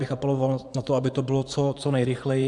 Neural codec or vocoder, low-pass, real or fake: vocoder, 24 kHz, 100 mel bands, Vocos; 10.8 kHz; fake